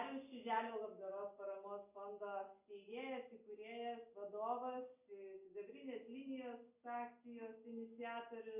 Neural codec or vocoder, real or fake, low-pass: none; real; 3.6 kHz